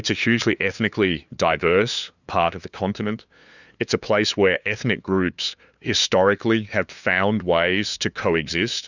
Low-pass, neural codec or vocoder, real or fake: 7.2 kHz; codec, 16 kHz, 2 kbps, FunCodec, trained on LibriTTS, 25 frames a second; fake